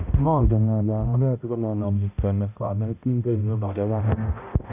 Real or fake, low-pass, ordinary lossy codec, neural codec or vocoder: fake; 3.6 kHz; none; codec, 16 kHz, 0.5 kbps, X-Codec, HuBERT features, trained on balanced general audio